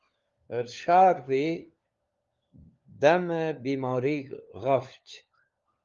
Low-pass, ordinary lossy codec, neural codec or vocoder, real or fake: 7.2 kHz; Opus, 32 kbps; codec, 16 kHz, 4 kbps, FunCodec, trained on LibriTTS, 50 frames a second; fake